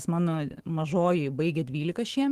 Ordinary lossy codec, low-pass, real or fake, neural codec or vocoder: Opus, 16 kbps; 14.4 kHz; fake; autoencoder, 48 kHz, 128 numbers a frame, DAC-VAE, trained on Japanese speech